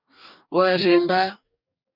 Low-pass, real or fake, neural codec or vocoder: 5.4 kHz; fake; codec, 44.1 kHz, 2.6 kbps, DAC